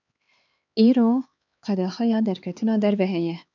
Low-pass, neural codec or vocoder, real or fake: 7.2 kHz; codec, 16 kHz, 4 kbps, X-Codec, HuBERT features, trained on LibriSpeech; fake